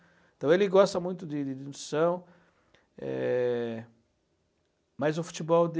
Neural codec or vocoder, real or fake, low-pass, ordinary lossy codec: none; real; none; none